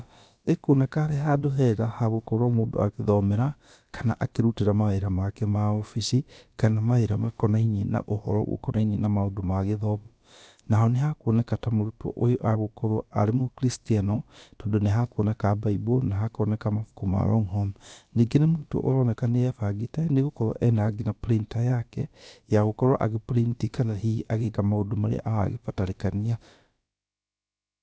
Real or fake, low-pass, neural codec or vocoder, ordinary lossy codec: fake; none; codec, 16 kHz, about 1 kbps, DyCAST, with the encoder's durations; none